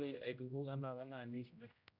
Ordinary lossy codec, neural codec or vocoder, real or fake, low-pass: none; codec, 16 kHz, 0.5 kbps, X-Codec, HuBERT features, trained on general audio; fake; 5.4 kHz